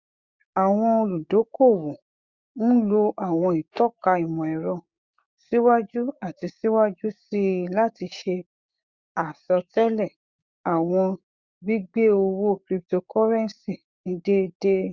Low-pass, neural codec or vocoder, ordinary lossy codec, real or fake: 7.2 kHz; codec, 16 kHz, 6 kbps, DAC; Opus, 64 kbps; fake